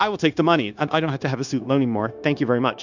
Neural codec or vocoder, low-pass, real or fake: codec, 16 kHz, 0.9 kbps, LongCat-Audio-Codec; 7.2 kHz; fake